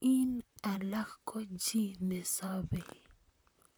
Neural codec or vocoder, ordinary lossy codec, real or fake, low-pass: vocoder, 44.1 kHz, 128 mel bands, Pupu-Vocoder; none; fake; none